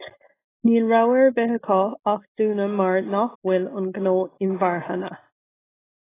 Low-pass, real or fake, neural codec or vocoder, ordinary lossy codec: 3.6 kHz; real; none; AAC, 16 kbps